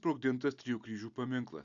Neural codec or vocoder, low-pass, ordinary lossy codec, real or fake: none; 7.2 kHz; Opus, 64 kbps; real